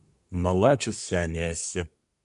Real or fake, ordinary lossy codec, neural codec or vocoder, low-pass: fake; MP3, 96 kbps; codec, 24 kHz, 1 kbps, SNAC; 10.8 kHz